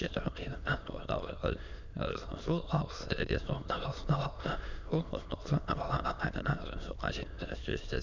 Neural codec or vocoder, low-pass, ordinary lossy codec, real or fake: autoencoder, 22.05 kHz, a latent of 192 numbers a frame, VITS, trained on many speakers; 7.2 kHz; none; fake